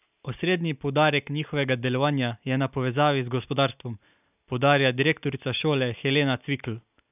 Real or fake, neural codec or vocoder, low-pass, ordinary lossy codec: real; none; 3.6 kHz; none